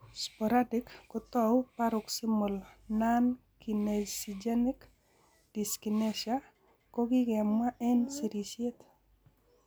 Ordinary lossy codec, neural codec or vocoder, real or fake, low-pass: none; none; real; none